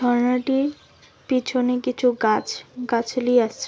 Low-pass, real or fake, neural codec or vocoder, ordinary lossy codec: none; real; none; none